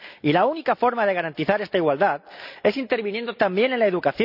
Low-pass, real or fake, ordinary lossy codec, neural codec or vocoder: 5.4 kHz; real; none; none